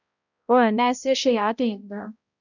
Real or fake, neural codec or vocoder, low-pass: fake; codec, 16 kHz, 0.5 kbps, X-Codec, HuBERT features, trained on balanced general audio; 7.2 kHz